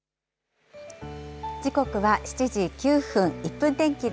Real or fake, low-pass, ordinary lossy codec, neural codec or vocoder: real; none; none; none